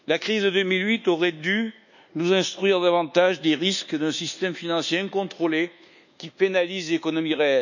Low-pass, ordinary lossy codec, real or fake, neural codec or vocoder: 7.2 kHz; none; fake; codec, 24 kHz, 1.2 kbps, DualCodec